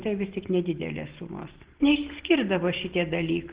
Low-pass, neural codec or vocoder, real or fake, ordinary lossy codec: 3.6 kHz; none; real; Opus, 16 kbps